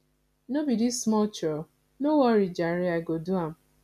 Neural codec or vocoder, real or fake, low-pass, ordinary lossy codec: none; real; 14.4 kHz; none